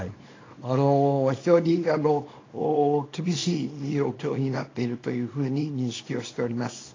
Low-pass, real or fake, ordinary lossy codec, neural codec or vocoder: 7.2 kHz; fake; AAC, 32 kbps; codec, 24 kHz, 0.9 kbps, WavTokenizer, small release